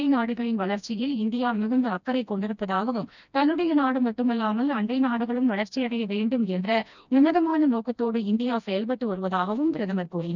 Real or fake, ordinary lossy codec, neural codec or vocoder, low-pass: fake; none; codec, 16 kHz, 1 kbps, FreqCodec, smaller model; 7.2 kHz